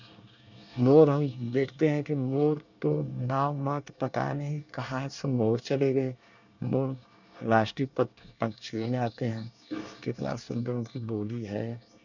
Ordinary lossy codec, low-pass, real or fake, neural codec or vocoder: none; 7.2 kHz; fake; codec, 24 kHz, 1 kbps, SNAC